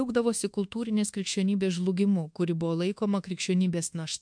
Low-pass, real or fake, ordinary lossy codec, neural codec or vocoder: 9.9 kHz; fake; MP3, 64 kbps; codec, 24 kHz, 1.2 kbps, DualCodec